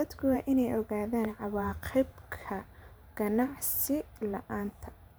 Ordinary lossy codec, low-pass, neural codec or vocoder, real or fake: none; none; vocoder, 44.1 kHz, 128 mel bands every 512 samples, BigVGAN v2; fake